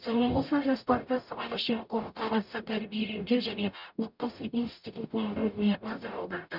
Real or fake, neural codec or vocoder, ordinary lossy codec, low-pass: fake; codec, 44.1 kHz, 0.9 kbps, DAC; none; 5.4 kHz